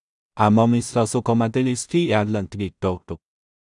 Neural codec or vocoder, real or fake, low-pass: codec, 16 kHz in and 24 kHz out, 0.4 kbps, LongCat-Audio-Codec, two codebook decoder; fake; 10.8 kHz